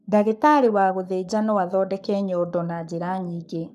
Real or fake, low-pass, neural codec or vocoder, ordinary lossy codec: fake; 14.4 kHz; codec, 44.1 kHz, 7.8 kbps, Pupu-Codec; none